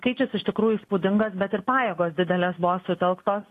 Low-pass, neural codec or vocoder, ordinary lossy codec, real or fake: 14.4 kHz; none; AAC, 48 kbps; real